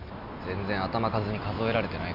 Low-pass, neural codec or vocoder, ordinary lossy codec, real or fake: 5.4 kHz; none; none; real